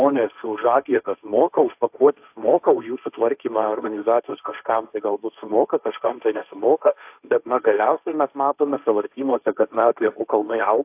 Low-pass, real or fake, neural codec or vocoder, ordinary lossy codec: 3.6 kHz; fake; codec, 16 kHz, 1.1 kbps, Voila-Tokenizer; AAC, 32 kbps